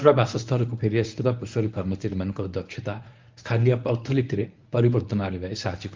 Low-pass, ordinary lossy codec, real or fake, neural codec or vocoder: 7.2 kHz; Opus, 24 kbps; fake; codec, 24 kHz, 0.9 kbps, WavTokenizer, medium speech release version 1